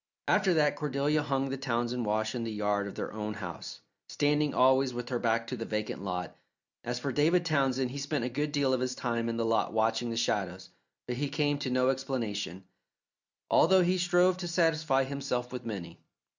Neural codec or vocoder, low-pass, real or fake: none; 7.2 kHz; real